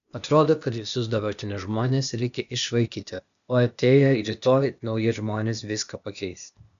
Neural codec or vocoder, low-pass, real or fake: codec, 16 kHz, 0.8 kbps, ZipCodec; 7.2 kHz; fake